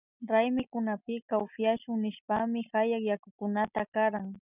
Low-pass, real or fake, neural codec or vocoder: 3.6 kHz; real; none